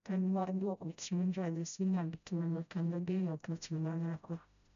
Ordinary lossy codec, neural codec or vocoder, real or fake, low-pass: none; codec, 16 kHz, 0.5 kbps, FreqCodec, smaller model; fake; 7.2 kHz